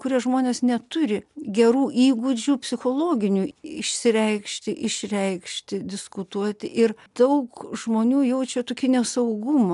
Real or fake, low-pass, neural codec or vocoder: real; 10.8 kHz; none